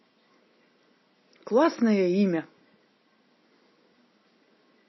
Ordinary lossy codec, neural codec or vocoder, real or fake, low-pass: MP3, 24 kbps; none; real; 7.2 kHz